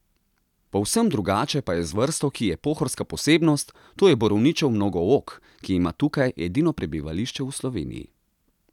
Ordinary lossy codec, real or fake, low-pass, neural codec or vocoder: none; real; 19.8 kHz; none